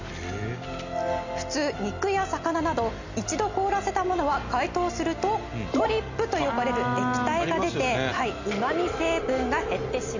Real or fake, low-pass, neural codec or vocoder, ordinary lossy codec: real; 7.2 kHz; none; Opus, 64 kbps